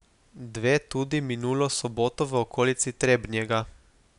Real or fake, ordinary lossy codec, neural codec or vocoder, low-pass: real; none; none; 10.8 kHz